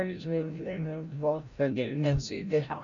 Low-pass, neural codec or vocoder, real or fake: 7.2 kHz; codec, 16 kHz, 0.5 kbps, FreqCodec, larger model; fake